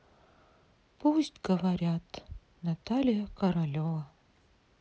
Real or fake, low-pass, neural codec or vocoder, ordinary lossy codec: real; none; none; none